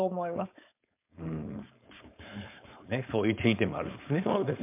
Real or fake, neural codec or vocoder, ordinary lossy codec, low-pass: fake; codec, 16 kHz, 4.8 kbps, FACodec; MP3, 32 kbps; 3.6 kHz